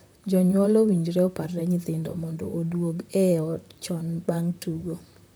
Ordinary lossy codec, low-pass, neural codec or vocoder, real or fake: none; none; vocoder, 44.1 kHz, 128 mel bands, Pupu-Vocoder; fake